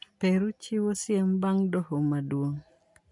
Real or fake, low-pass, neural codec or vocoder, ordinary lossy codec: real; 10.8 kHz; none; none